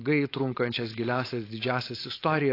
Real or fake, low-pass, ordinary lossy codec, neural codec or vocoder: fake; 5.4 kHz; AAC, 32 kbps; codec, 16 kHz, 4.8 kbps, FACodec